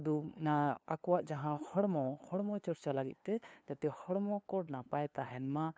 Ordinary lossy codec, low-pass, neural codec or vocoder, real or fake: none; none; codec, 16 kHz, 2 kbps, FunCodec, trained on LibriTTS, 25 frames a second; fake